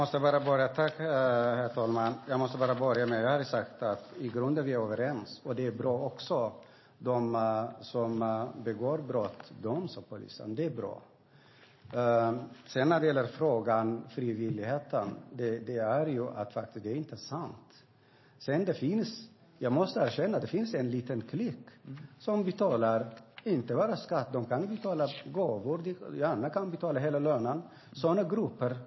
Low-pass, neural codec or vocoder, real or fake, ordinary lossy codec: 7.2 kHz; none; real; MP3, 24 kbps